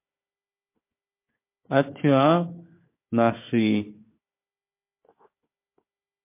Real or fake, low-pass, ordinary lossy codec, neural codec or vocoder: fake; 3.6 kHz; MP3, 24 kbps; codec, 16 kHz, 4 kbps, FunCodec, trained on Chinese and English, 50 frames a second